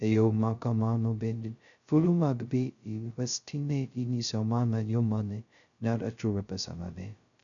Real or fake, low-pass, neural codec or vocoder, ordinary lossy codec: fake; 7.2 kHz; codec, 16 kHz, 0.2 kbps, FocalCodec; none